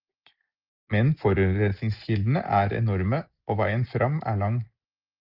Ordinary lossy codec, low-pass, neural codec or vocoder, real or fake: Opus, 32 kbps; 5.4 kHz; none; real